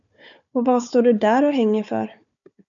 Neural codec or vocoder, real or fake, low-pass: codec, 16 kHz, 4 kbps, FunCodec, trained on Chinese and English, 50 frames a second; fake; 7.2 kHz